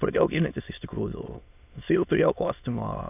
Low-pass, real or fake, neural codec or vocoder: 3.6 kHz; fake; autoencoder, 22.05 kHz, a latent of 192 numbers a frame, VITS, trained on many speakers